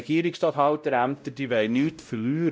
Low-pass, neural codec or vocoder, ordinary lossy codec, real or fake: none; codec, 16 kHz, 0.5 kbps, X-Codec, WavLM features, trained on Multilingual LibriSpeech; none; fake